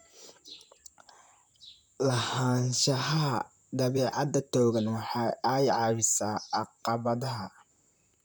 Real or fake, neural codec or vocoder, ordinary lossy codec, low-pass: fake; vocoder, 44.1 kHz, 128 mel bands, Pupu-Vocoder; none; none